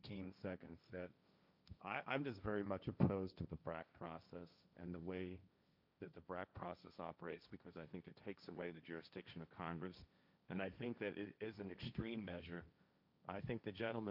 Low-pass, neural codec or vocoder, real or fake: 5.4 kHz; codec, 16 kHz, 1.1 kbps, Voila-Tokenizer; fake